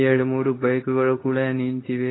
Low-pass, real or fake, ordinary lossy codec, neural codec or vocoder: 7.2 kHz; fake; AAC, 16 kbps; codec, 24 kHz, 0.9 kbps, WavTokenizer, medium speech release version 2